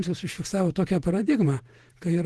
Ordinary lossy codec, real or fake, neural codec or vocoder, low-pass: Opus, 16 kbps; fake; vocoder, 48 kHz, 128 mel bands, Vocos; 10.8 kHz